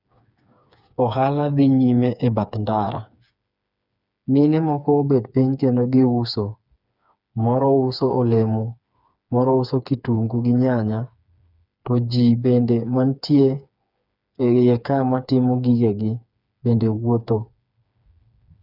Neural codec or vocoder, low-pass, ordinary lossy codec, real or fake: codec, 16 kHz, 4 kbps, FreqCodec, smaller model; 5.4 kHz; none; fake